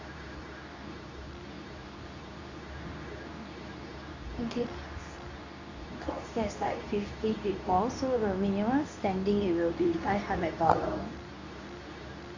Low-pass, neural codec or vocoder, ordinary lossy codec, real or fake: 7.2 kHz; codec, 24 kHz, 0.9 kbps, WavTokenizer, medium speech release version 2; AAC, 48 kbps; fake